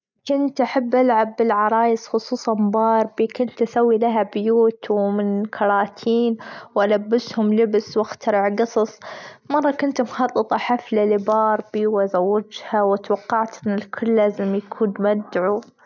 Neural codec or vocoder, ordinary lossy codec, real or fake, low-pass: none; none; real; 7.2 kHz